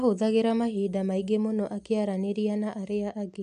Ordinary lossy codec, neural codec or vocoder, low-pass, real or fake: AAC, 96 kbps; none; 9.9 kHz; real